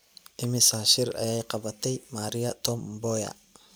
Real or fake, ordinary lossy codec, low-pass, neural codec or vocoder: real; none; none; none